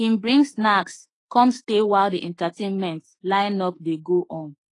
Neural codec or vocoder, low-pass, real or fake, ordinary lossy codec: autoencoder, 48 kHz, 32 numbers a frame, DAC-VAE, trained on Japanese speech; 10.8 kHz; fake; AAC, 32 kbps